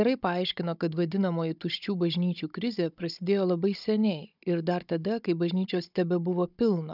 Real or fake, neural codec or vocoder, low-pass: fake; codec, 16 kHz, 16 kbps, FunCodec, trained on Chinese and English, 50 frames a second; 5.4 kHz